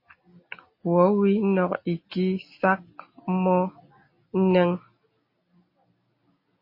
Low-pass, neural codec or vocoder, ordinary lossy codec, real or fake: 5.4 kHz; none; MP3, 24 kbps; real